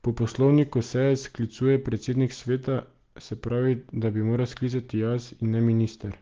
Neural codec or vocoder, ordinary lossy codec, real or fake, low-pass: none; Opus, 16 kbps; real; 7.2 kHz